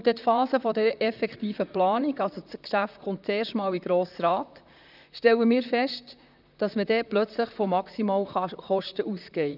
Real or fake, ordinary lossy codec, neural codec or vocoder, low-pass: fake; none; vocoder, 44.1 kHz, 128 mel bands, Pupu-Vocoder; 5.4 kHz